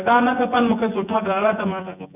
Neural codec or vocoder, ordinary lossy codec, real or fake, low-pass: vocoder, 24 kHz, 100 mel bands, Vocos; none; fake; 3.6 kHz